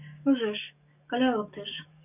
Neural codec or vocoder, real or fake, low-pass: codec, 44.1 kHz, 7.8 kbps, DAC; fake; 3.6 kHz